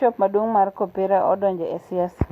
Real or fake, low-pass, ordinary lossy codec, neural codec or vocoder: real; 14.4 kHz; AAC, 48 kbps; none